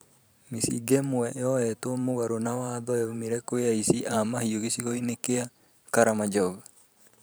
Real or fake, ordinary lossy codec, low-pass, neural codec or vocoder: fake; none; none; vocoder, 44.1 kHz, 128 mel bands every 512 samples, BigVGAN v2